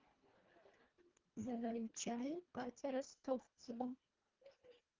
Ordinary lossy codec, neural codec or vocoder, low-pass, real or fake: Opus, 32 kbps; codec, 24 kHz, 1.5 kbps, HILCodec; 7.2 kHz; fake